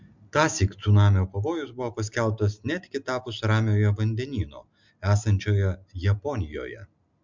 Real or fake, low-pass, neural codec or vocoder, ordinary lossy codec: real; 7.2 kHz; none; MP3, 64 kbps